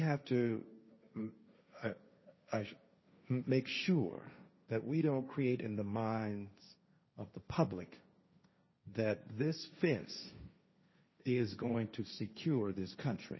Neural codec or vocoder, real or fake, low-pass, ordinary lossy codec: codec, 16 kHz, 1.1 kbps, Voila-Tokenizer; fake; 7.2 kHz; MP3, 24 kbps